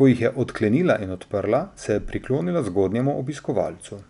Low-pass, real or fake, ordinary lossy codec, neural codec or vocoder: 10.8 kHz; real; none; none